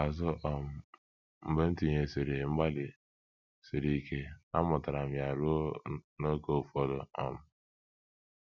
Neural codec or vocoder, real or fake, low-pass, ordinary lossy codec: none; real; 7.2 kHz; none